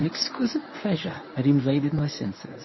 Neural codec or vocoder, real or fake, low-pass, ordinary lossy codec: codec, 24 kHz, 0.9 kbps, WavTokenizer, medium speech release version 1; fake; 7.2 kHz; MP3, 24 kbps